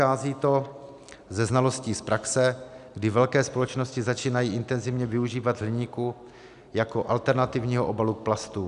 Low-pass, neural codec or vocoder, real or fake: 10.8 kHz; none; real